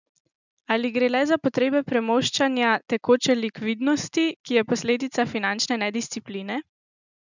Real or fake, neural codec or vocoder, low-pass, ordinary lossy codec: real; none; 7.2 kHz; none